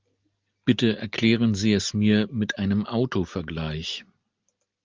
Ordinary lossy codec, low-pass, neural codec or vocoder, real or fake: Opus, 24 kbps; 7.2 kHz; none; real